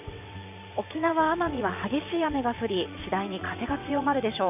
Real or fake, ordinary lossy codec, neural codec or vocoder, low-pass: fake; none; vocoder, 22.05 kHz, 80 mel bands, WaveNeXt; 3.6 kHz